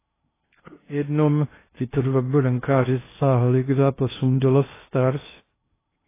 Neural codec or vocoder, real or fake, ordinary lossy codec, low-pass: codec, 16 kHz in and 24 kHz out, 0.6 kbps, FocalCodec, streaming, 2048 codes; fake; AAC, 16 kbps; 3.6 kHz